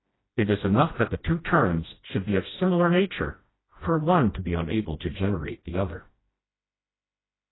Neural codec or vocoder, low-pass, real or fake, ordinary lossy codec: codec, 16 kHz, 1 kbps, FreqCodec, smaller model; 7.2 kHz; fake; AAC, 16 kbps